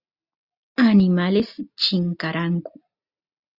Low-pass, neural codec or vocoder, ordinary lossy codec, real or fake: 5.4 kHz; none; Opus, 64 kbps; real